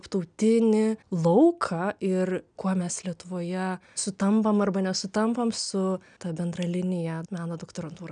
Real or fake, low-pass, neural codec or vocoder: real; 9.9 kHz; none